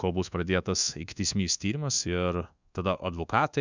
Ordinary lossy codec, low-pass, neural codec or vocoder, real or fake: Opus, 64 kbps; 7.2 kHz; codec, 24 kHz, 1.2 kbps, DualCodec; fake